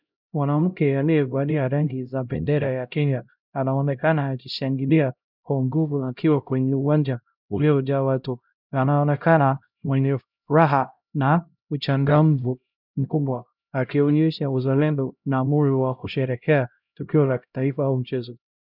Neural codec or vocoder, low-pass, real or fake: codec, 16 kHz, 0.5 kbps, X-Codec, HuBERT features, trained on LibriSpeech; 5.4 kHz; fake